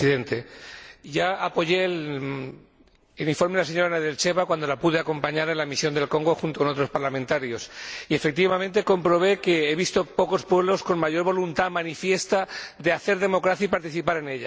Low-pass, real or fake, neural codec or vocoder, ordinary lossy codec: none; real; none; none